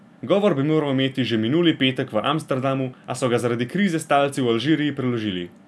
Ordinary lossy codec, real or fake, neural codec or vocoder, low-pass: none; real; none; none